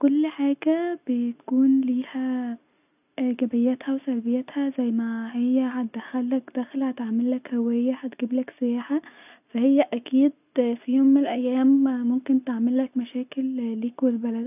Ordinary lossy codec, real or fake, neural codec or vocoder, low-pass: none; real; none; 3.6 kHz